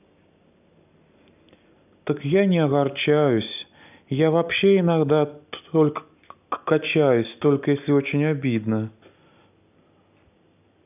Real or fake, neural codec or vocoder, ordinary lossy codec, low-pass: real; none; none; 3.6 kHz